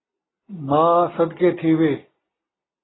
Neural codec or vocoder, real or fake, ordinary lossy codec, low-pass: none; real; AAC, 16 kbps; 7.2 kHz